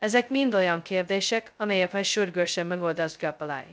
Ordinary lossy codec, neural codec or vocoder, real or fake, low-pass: none; codec, 16 kHz, 0.2 kbps, FocalCodec; fake; none